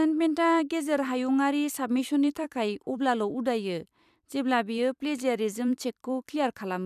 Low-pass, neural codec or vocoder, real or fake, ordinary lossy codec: 14.4 kHz; vocoder, 44.1 kHz, 128 mel bands every 256 samples, BigVGAN v2; fake; none